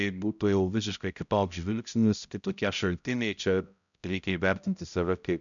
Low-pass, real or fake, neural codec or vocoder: 7.2 kHz; fake; codec, 16 kHz, 0.5 kbps, X-Codec, HuBERT features, trained on balanced general audio